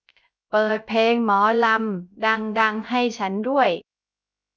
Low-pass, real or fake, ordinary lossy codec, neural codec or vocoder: none; fake; none; codec, 16 kHz, 0.3 kbps, FocalCodec